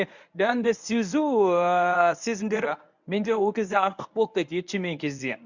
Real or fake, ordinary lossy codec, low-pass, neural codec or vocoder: fake; none; 7.2 kHz; codec, 24 kHz, 0.9 kbps, WavTokenizer, medium speech release version 1